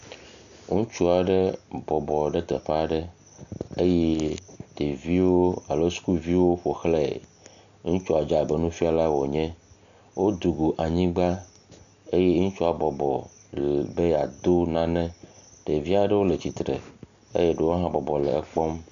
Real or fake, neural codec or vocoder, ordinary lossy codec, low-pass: real; none; MP3, 96 kbps; 7.2 kHz